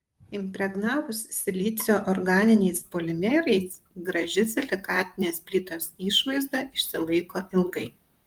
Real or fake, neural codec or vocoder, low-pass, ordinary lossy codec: fake; vocoder, 44.1 kHz, 128 mel bands, Pupu-Vocoder; 19.8 kHz; Opus, 32 kbps